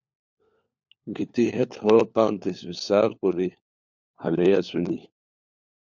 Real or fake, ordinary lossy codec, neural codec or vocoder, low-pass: fake; MP3, 64 kbps; codec, 16 kHz, 4 kbps, FunCodec, trained on LibriTTS, 50 frames a second; 7.2 kHz